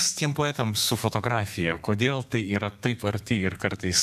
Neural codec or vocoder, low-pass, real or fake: codec, 32 kHz, 1.9 kbps, SNAC; 14.4 kHz; fake